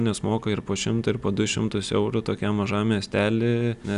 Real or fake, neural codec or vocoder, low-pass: real; none; 10.8 kHz